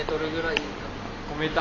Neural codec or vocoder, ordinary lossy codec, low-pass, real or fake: none; none; 7.2 kHz; real